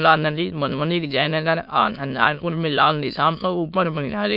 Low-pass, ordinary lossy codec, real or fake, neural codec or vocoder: 5.4 kHz; none; fake; autoencoder, 22.05 kHz, a latent of 192 numbers a frame, VITS, trained on many speakers